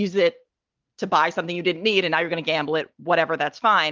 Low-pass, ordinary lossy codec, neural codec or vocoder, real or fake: 7.2 kHz; Opus, 32 kbps; none; real